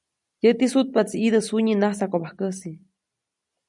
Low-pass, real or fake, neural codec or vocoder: 10.8 kHz; real; none